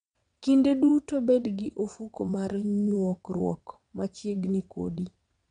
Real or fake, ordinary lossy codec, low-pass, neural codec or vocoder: fake; MP3, 64 kbps; 9.9 kHz; vocoder, 22.05 kHz, 80 mel bands, WaveNeXt